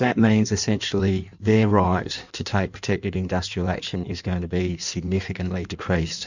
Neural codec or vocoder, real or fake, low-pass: codec, 16 kHz in and 24 kHz out, 1.1 kbps, FireRedTTS-2 codec; fake; 7.2 kHz